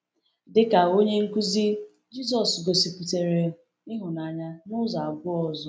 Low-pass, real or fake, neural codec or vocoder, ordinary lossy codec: none; real; none; none